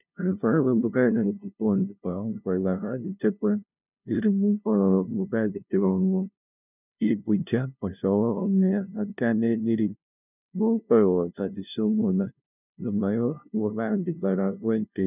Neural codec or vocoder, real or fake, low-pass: codec, 16 kHz, 0.5 kbps, FunCodec, trained on LibriTTS, 25 frames a second; fake; 3.6 kHz